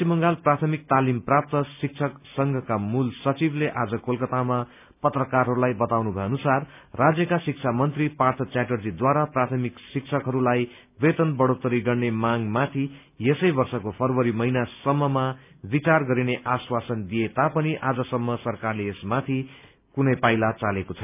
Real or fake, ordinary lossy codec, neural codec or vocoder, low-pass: real; none; none; 3.6 kHz